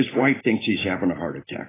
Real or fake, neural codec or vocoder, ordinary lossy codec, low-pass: real; none; AAC, 16 kbps; 3.6 kHz